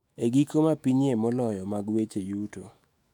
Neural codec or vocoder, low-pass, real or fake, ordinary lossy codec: autoencoder, 48 kHz, 128 numbers a frame, DAC-VAE, trained on Japanese speech; 19.8 kHz; fake; none